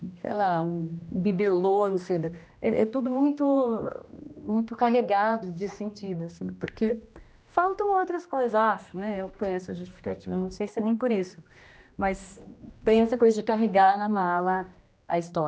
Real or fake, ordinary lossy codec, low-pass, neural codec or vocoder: fake; none; none; codec, 16 kHz, 1 kbps, X-Codec, HuBERT features, trained on general audio